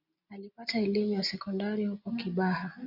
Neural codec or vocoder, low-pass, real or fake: none; 5.4 kHz; real